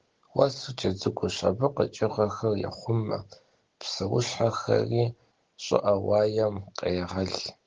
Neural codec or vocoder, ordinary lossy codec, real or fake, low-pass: none; Opus, 16 kbps; real; 7.2 kHz